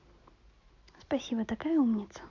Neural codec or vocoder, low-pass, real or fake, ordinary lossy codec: none; 7.2 kHz; real; none